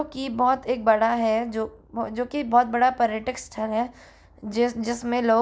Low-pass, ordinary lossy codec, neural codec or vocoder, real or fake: none; none; none; real